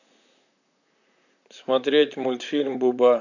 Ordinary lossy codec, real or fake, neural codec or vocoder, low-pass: none; fake; vocoder, 44.1 kHz, 128 mel bands, Pupu-Vocoder; 7.2 kHz